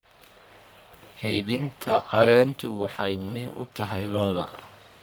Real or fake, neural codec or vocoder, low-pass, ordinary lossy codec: fake; codec, 44.1 kHz, 1.7 kbps, Pupu-Codec; none; none